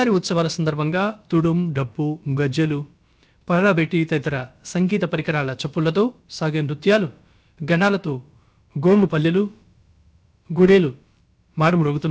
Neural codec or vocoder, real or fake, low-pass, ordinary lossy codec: codec, 16 kHz, about 1 kbps, DyCAST, with the encoder's durations; fake; none; none